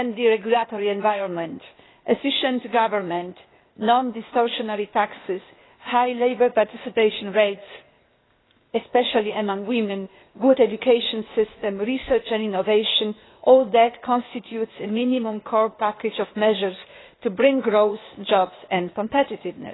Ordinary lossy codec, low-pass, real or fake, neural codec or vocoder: AAC, 16 kbps; 7.2 kHz; fake; codec, 16 kHz, 0.8 kbps, ZipCodec